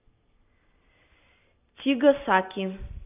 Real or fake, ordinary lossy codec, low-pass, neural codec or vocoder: real; AAC, 32 kbps; 3.6 kHz; none